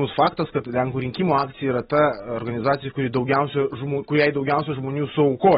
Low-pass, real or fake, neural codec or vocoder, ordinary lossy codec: 19.8 kHz; real; none; AAC, 16 kbps